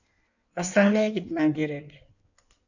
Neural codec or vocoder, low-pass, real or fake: codec, 16 kHz in and 24 kHz out, 1.1 kbps, FireRedTTS-2 codec; 7.2 kHz; fake